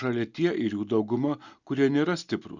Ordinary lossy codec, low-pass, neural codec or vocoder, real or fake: Opus, 64 kbps; 7.2 kHz; none; real